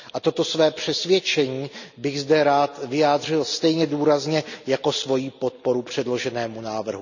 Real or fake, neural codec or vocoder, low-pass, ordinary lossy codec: real; none; 7.2 kHz; none